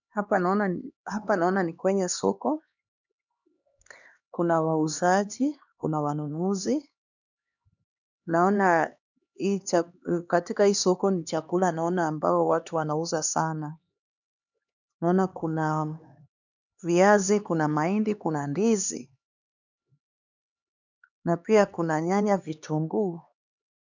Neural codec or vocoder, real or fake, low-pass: codec, 16 kHz, 2 kbps, X-Codec, HuBERT features, trained on LibriSpeech; fake; 7.2 kHz